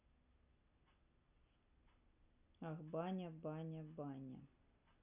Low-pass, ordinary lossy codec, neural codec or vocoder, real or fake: 3.6 kHz; none; none; real